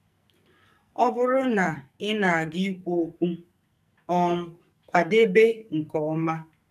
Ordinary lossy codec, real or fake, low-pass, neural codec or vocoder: none; fake; 14.4 kHz; codec, 44.1 kHz, 2.6 kbps, SNAC